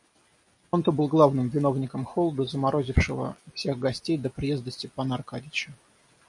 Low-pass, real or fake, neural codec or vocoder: 10.8 kHz; real; none